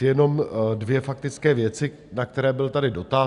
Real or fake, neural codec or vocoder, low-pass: real; none; 10.8 kHz